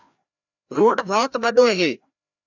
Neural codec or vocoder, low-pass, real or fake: codec, 16 kHz, 1 kbps, FreqCodec, larger model; 7.2 kHz; fake